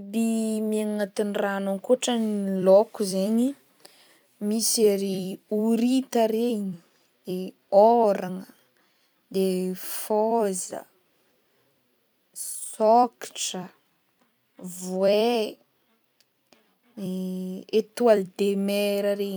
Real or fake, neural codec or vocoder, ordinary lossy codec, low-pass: fake; vocoder, 44.1 kHz, 128 mel bands every 256 samples, BigVGAN v2; none; none